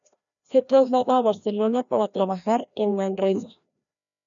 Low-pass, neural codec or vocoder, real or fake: 7.2 kHz; codec, 16 kHz, 1 kbps, FreqCodec, larger model; fake